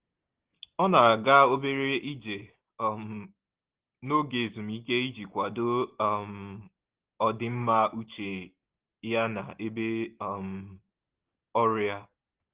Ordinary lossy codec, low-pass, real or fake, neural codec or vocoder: Opus, 16 kbps; 3.6 kHz; real; none